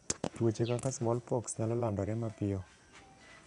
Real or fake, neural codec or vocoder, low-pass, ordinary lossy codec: fake; vocoder, 24 kHz, 100 mel bands, Vocos; 10.8 kHz; none